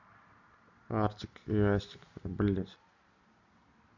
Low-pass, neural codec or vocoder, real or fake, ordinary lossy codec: 7.2 kHz; none; real; none